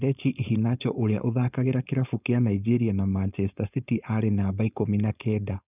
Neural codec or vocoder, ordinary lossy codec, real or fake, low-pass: codec, 16 kHz, 4.8 kbps, FACodec; none; fake; 3.6 kHz